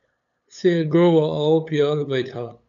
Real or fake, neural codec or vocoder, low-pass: fake; codec, 16 kHz, 8 kbps, FunCodec, trained on LibriTTS, 25 frames a second; 7.2 kHz